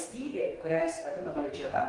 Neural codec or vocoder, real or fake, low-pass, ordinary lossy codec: codec, 44.1 kHz, 2.6 kbps, DAC; fake; 10.8 kHz; Opus, 24 kbps